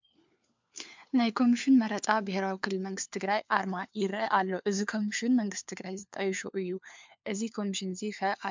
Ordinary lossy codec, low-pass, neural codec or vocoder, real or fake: MP3, 64 kbps; 7.2 kHz; codec, 16 kHz, 4 kbps, FunCodec, trained on LibriTTS, 50 frames a second; fake